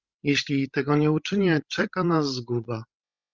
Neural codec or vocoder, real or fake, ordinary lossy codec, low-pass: codec, 16 kHz, 16 kbps, FreqCodec, larger model; fake; Opus, 24 kbps; 7.2 kHz